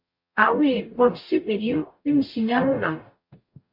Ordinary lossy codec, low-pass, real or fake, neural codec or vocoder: MP3, 32 kbps; 5.4 kHz; fake; codec, 44.1 kHz, 0.9 kbps, DAC